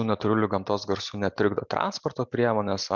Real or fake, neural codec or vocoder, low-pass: real; none; 7.2 kHz